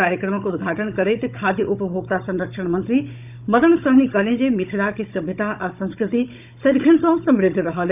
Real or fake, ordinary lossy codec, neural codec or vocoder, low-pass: fake; none; codec, 16 kHz, 16 kbps, FunCodec, trained on Chinese and English, 50 frames a second; 3.6 kHz